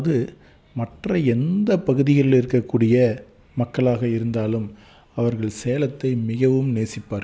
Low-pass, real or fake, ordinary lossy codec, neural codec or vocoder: none; real; none; none